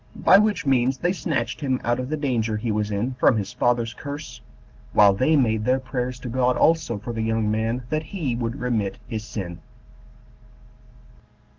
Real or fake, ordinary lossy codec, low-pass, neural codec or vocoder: real; Opus, 16 kbps; 7.2 kHz; none